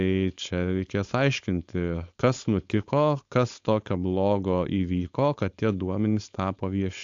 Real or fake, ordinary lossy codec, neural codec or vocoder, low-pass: fake; AAC, 64 kbps; codec, 16 kHz, 4.8 kbps, FACodec; 7.2 kHz